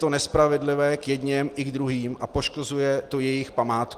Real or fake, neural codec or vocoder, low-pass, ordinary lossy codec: real; none; 14.4 kHz; Opus, 24 kbps